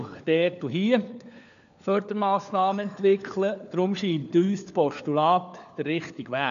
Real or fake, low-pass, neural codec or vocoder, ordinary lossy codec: fake; 7.2 kHz; codec, 16 kHz, 4 kbps, FunCodec, trained on LibriTTS, 50 frames a second; none